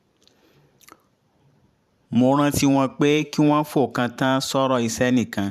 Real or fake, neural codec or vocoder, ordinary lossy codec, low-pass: fake; vocoder, 44.1 kHz, 128 mel bands every 512 samples, BigVGAN v2; none; 14.4 kHz